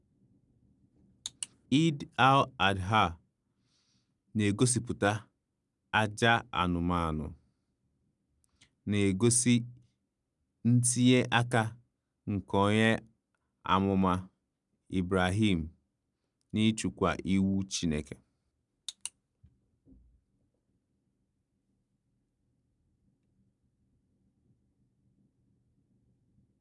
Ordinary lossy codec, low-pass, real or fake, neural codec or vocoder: none; 10.8 kHz; real; none